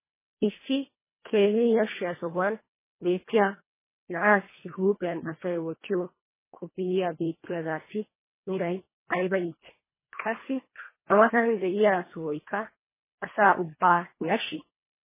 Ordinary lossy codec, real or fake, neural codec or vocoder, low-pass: MP3, 16 kbps; fake; codec, 24 kHz, 1.5 kbps, HILCodec; 3.6 kHz